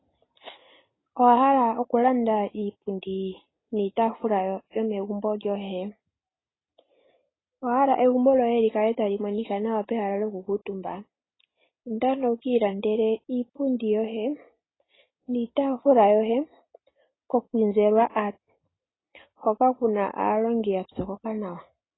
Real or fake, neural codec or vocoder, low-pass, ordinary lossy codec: real; none; 7.2 kHz; AAC, 16 kbps